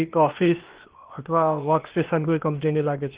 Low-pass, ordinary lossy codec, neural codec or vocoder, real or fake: 3.6 kHz; Opus, 16 kbps; codec, 16 kHz, 0.8 kbps, ZipCodec; fake